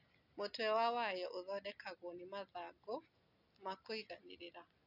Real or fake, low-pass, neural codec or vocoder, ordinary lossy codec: real; 5.4 kHz; none; none